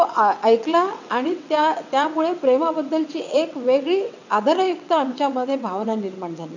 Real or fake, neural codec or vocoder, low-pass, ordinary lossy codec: fake; vocoder, 22.05 kHz, 80 mel bands, WaveNeXt; 7.2 kHz; none